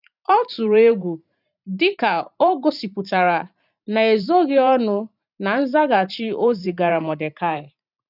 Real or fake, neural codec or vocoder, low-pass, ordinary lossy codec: fake; vocoder, 44.1 kHz, 128 mel bands every 512 samples, BigVGAN v2; 5.4 kHz; none